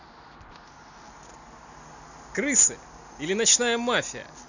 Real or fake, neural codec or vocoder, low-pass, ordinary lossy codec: real; none; 7.2 kHz; none